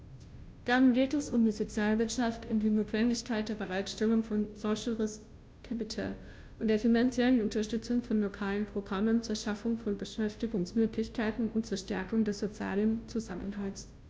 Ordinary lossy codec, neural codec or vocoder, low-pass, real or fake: none; codec, 16 kHz, 0.5 kbps, FunCodec, trained on Chinese and English, 25 frames a second; none; fake